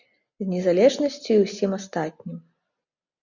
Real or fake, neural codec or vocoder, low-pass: real; none; 7.2 kHz